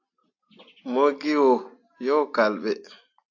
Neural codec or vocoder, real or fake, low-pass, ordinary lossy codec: none; real; 7.2 kHz; AAC, 48 kbps